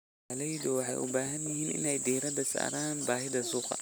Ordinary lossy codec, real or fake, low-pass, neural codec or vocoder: none; real; none; none